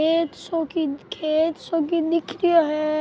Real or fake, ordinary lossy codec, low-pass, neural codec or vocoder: real; none; none; none